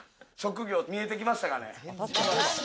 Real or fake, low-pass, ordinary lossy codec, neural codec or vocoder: real; none; none; none